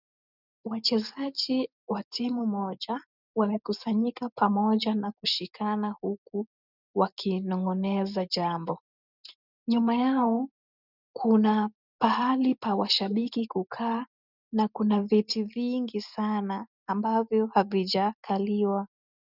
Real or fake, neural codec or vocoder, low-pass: real; none; 5.4 kHz